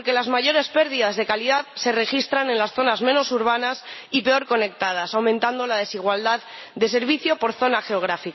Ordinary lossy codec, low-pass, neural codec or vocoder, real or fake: MP3, 24 kbps; 7.2 kHz; none; real